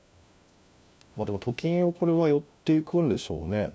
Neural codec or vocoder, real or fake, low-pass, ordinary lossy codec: codec, 16 kHz, 1 kbps, FunCodec, trained on LibriTTS, 50 frames a second; fake; none; none